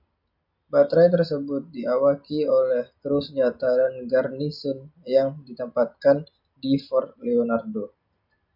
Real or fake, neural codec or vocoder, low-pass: real; none; 5.4 kHz